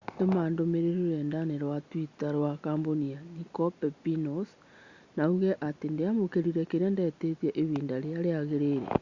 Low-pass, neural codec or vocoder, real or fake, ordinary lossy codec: 7.2 kHz; none; real; Opus, 64 kbps